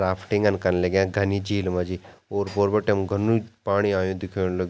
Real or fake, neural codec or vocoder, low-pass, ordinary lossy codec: real; none; none; none